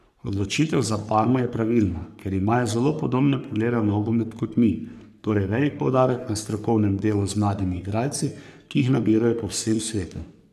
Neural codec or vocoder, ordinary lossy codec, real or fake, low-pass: codec, 44.1 kHz, 3.4 kbps, Pupu-Codec; none; fake; 14.4 kHz